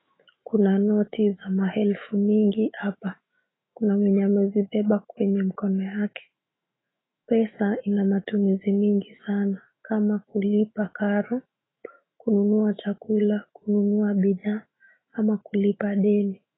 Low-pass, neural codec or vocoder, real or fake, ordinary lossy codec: 7.2 kHz; autoencoder, 48 kHz, 128 numbers a frame, DAC-VAE, trained on Japanese speech; fake; AAC, 16 kbps